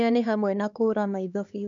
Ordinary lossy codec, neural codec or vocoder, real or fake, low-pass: none; codec, 16 kHz, 2 kbps, X-Codec, HuBERT features, trained on LibriSpeech; fake; 7.2 kHz